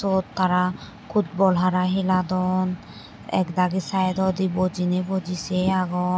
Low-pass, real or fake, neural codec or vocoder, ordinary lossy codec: none; real; none; none